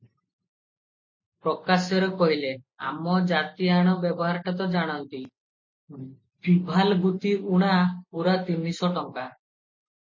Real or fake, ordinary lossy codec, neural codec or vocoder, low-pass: real; MP3, 32 kbps; none; 7.2 kHz